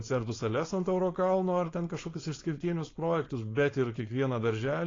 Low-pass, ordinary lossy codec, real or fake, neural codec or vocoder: 7.2 kHz; AAC, 32 kbps; fake; codec, 16 kHz, 4.8 kbps, FACodec